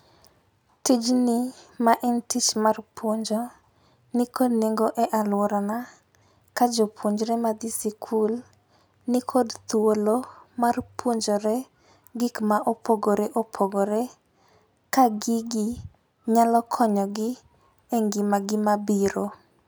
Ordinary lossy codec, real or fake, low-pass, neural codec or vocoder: none; real; none; none